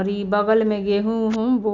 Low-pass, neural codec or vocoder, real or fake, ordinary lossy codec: 7.2 kHz; none; real; none